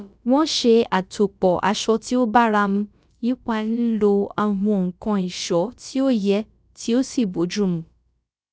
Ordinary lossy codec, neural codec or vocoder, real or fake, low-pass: none; codec, 16 kHz, about 1 kbps, DyCAST, with the encoder's durations; fake; none